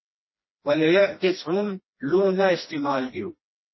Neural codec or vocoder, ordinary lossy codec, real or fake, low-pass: codec, 16 kHz, 1 kbps, FreqCodec, smaller model; MP3, 24 kbps; fake; 7.2 kHz